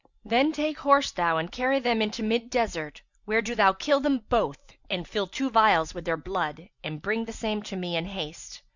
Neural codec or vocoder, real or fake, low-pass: none; real; 7.2 kHz